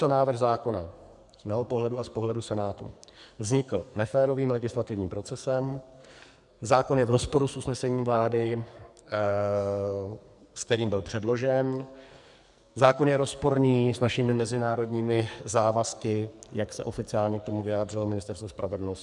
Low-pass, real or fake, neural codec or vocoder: 10.8 kHz; fake; codec, 44.1 kHz, 2.6 kbps, SNAC